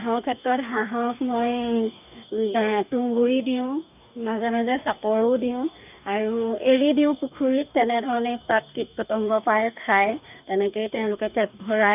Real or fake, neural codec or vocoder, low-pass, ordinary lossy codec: fake; codec, 44.1 kHz, 2.6 kbps, DAC; 3.6 kHz; none